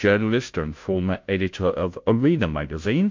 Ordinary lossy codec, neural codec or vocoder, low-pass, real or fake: MP3, 48 kbps; codec, 16 kHz, 0.5 kbps, FunCodec, trained on Chinese and English, 25 frames a second; 7.2 kHz; fake